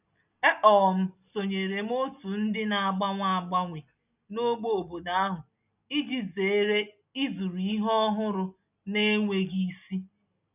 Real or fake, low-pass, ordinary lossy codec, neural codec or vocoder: real; 3.6 kHz; none; none